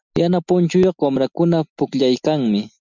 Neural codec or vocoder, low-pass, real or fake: none; 7.2 kHz; real